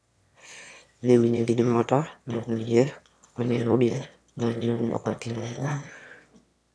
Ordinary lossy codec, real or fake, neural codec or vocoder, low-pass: none; fake; autoencoder, 22.05 kHz, a latent of 192 numbers a frame, VITS, trained on one speaker; none